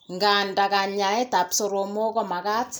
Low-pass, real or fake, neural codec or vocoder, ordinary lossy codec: none; real; none; none